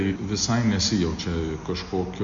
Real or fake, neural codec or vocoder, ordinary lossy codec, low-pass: real; none; Opus, 64 kbps; 7.2 kHz